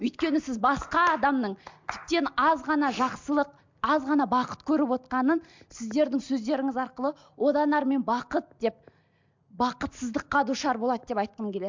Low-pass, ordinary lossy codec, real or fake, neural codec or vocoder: 7.2 kHz; MP3, 64 kbps; real; none